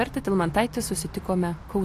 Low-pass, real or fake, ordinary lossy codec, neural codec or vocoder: 14.4 kHz; real; AAC, 64 kbps; none